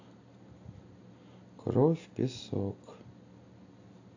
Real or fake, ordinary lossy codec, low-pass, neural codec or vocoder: real; AAC, 48 kbps; 7.2 kHz; none